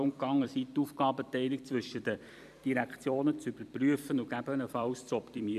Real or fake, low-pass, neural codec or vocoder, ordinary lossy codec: fake; 14.4 kHz; vocoder, 44.1 kHz, 128 mel bands every 256 samples, BigVGAN v2; none